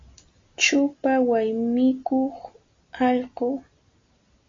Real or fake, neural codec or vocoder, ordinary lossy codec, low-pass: real; none; AAC, 32 kbps; 7.2 kHz